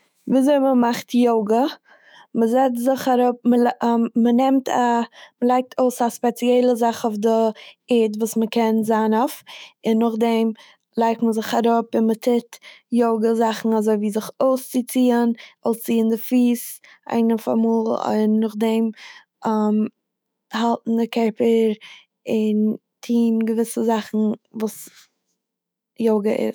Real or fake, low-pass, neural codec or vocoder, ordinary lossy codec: fake; none; autoencoder, 48 kHz, 128 numbers a frame, DAC-VAE, trained on Japanese speech; none